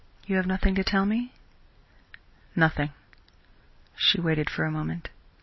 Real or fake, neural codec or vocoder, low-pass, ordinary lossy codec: real; none; 7.2 kHz; MP3, 24 kbps